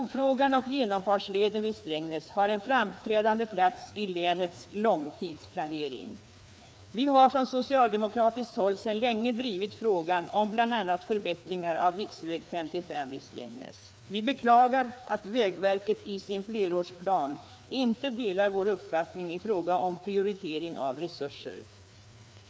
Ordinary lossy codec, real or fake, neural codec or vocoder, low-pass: none; fake; codec, 16 kHz, 2 kbps, FreqCodec, larger model; none